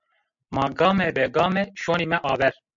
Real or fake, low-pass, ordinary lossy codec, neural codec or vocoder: real; 7.2 kHz; MP3, 64 kbps; none